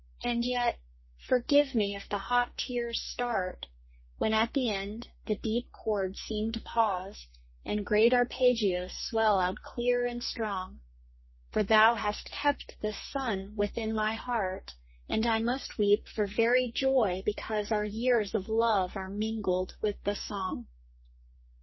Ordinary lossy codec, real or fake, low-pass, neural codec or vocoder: MP3, 24 kbps; fake; 7.2 kHz; codec, 44.1 kHz, 2.6 kbps, SNAC